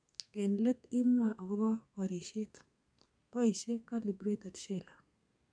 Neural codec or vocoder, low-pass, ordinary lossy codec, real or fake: codec, 32 kHz, 1.9 kbps, SNAC; 9.9 kHz; none; fake